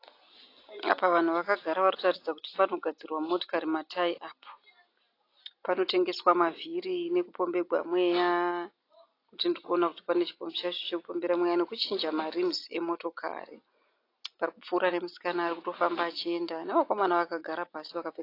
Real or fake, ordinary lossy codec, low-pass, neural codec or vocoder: real; AAC, 32 kbps; 5.4 kHz; none